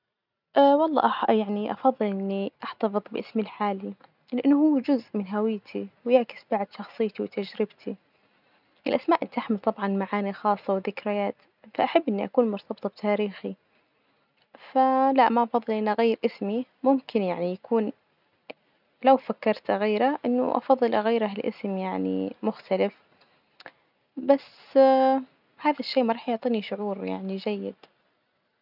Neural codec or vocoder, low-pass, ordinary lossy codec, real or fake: none; 5.4 kHz; none; real